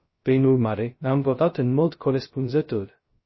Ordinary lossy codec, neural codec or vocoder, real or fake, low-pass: MP3, 24 kbps; codec, 16 kHz, 0.2 kbps, FocalCodec; fake; 7.2 kHz